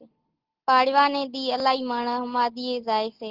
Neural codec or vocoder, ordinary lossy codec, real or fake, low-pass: none; Opus, 16 kbps; real; 5.4 kHz